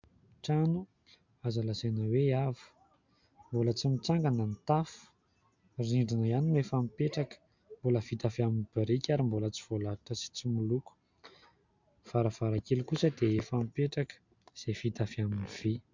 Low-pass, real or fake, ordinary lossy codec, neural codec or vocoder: 7.2 kHz; real; MP3, 64 kbps; none